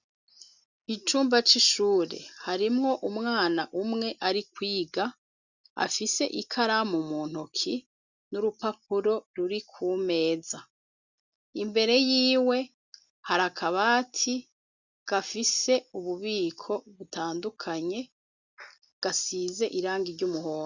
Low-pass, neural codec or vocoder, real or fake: 7.2 kHz; none; real